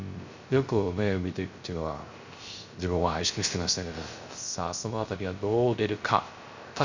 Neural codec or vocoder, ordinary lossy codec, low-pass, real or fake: codec, 16 kHz, 0.3 kbps, FocalCodec; Opus, 64 kbps; 7.2 kHz; fake